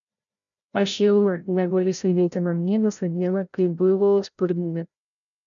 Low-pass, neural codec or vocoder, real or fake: 7.2 kHz; codec, 16 kHz, 0.5 kbps, FreqCodec, larger model; fake